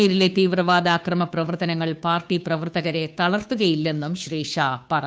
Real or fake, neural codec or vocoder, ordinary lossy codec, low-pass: fake; codec, 16 kHz, 2 kbps, FunCodec, trained on Chinese and English, 25 frames a second; none; none